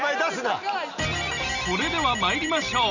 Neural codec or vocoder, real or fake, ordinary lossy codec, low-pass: none; real; none; 7.2 kHz